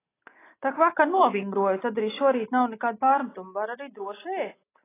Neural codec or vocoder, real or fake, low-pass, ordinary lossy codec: none; real; 3.6 kHz; AAC, 16 kbps